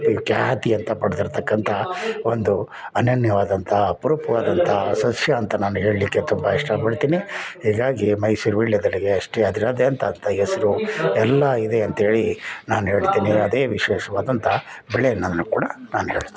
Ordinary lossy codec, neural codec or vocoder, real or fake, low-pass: none; none; real; none